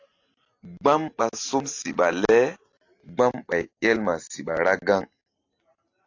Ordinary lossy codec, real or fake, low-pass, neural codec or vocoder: AAC, 48 kbps; real; 7.2 kHz; none